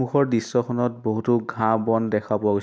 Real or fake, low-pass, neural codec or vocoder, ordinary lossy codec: real; none; none; none